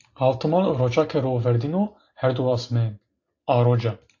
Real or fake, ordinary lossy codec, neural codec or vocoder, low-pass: real; AAC, 48 kbps; none; 7.2 kHz